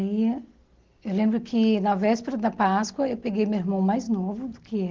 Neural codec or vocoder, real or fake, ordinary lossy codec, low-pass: none; real; Opus, 16 kbps; 7.2 kHz